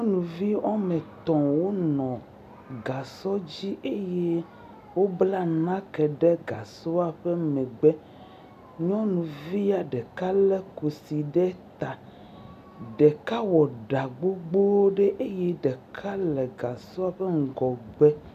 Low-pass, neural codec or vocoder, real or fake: 14.4 kHz; none; real